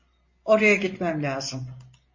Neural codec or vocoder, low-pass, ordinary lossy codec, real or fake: none; 7.2 kHz; MP3, 32 kbps; real